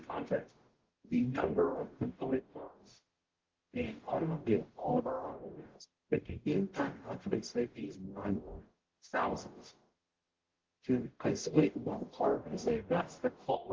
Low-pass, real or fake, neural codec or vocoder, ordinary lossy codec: 7.2 kHz; fake; codec, 44.1 kHz, 0.9 kbps, DAC; Opus, 16 kbps